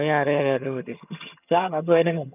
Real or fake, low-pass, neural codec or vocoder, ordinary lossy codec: fake; 3.6 kHz; vocoder, 22.05 kHz, 80 mel bands, HiFi-GAN; none